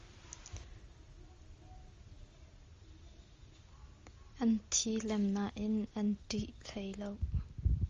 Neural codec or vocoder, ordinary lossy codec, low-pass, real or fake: none; Opus, 32 kbps; 7.2 kHz; real